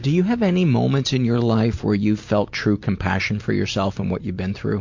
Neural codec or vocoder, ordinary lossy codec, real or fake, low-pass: none; MP3, 48 kbps; real; 7.2 kHz